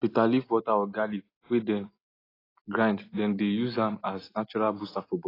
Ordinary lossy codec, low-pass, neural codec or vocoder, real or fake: AAC, 24 kbps; 5.4 kHz; none; real